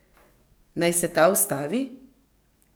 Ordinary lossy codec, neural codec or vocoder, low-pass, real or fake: none; codec, 44.1 kHz, 7.8 kbps, DAC; none; fake